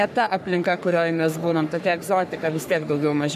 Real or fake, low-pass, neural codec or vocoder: fake; 14.4 kHz; codec, 44.1 kHz, 3.4 kbps, Pupu-Codec